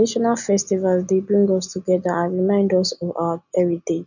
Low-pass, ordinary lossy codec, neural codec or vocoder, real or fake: 7.2 kHz; none; none; real